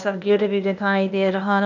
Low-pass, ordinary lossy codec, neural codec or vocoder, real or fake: 7.2 kHz; none; codec, 16 kHz, 0.8 kbps, ZipCodec; fake